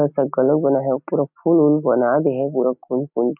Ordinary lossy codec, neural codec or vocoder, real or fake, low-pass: none; none; real; 3.6 kHz